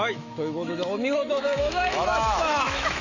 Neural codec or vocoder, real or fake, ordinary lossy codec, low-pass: autoencoder, 48 kHz, 128 numbers a frame, DAC-VAE, trained on Japanese speech; fake; AAC, 48 kbps; 7.2 kHz